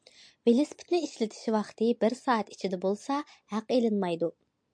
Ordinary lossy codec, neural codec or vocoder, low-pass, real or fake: MP3, 64 kbps; none; 9.9 kHz; real